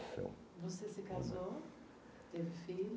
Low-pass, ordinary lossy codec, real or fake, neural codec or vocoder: none; none; real; none